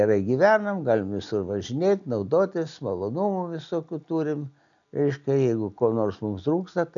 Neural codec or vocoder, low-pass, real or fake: none; 7.2 kHz; real